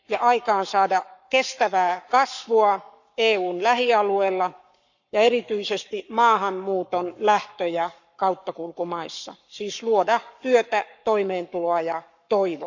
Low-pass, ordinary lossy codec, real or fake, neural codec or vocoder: 7.2 kHz; none; fake; codec, 44.1 kHz, 7.8 kbps, Pupu-Codec